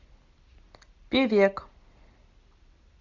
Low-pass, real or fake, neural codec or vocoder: 7.2 kHz; real; none